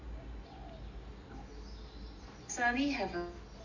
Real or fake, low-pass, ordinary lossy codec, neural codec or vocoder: real; 7.2 kHz; AAC, 48 kbps; none